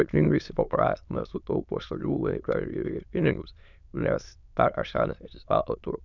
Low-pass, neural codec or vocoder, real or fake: 7.2 kHz; autoencoder, 22.05 kHz, a latent of 192 numbers a frame, VITS, trained on many speakers; fake